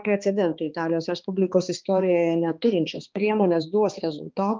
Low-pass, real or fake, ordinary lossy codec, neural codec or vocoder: 7.2 kHz; fake; Opus, 32 kbps; codec, 16 kHz, 2 kbps, X-Codec, HuBERT features, trained on balanced general audio